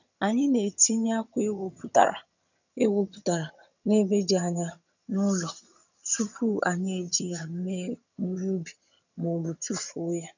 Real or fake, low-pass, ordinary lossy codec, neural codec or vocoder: fake; 7.2 kHz; none; vocoder, 22.05 kHz, 80 mel bands, HiFi-GAN